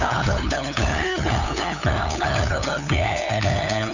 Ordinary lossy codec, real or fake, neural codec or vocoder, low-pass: none; fake; codec, 16 kHz, 8 kbps, FunCodec, trained on LibriTTS, 25 frames a second; 7.2 kHz